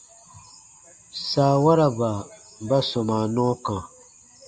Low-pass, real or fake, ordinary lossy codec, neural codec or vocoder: 9.9 kHz; real; Opus, 64 kbps; none